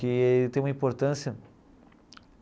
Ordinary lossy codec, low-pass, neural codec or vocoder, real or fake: none; none; none; real